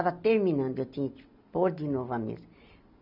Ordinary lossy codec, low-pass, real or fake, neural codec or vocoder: none; 5.4 kHz; real; none